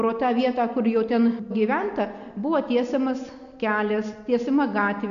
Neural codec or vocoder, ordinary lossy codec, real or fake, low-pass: none; AAC, 96 kbps; real; 7.2 kHz